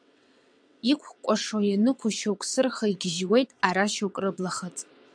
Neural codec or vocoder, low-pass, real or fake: vocoder, 22.05 kHz, 80 mel bands, WaveNeXt; 9.9 kHz; fake